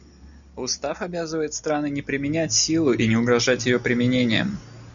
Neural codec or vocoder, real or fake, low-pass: none; real; 7.2 kHz